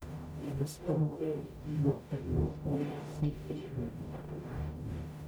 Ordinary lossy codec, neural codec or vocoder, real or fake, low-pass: none; codec, 44.1 kHz, 0.9 kbps, DAC; fake; none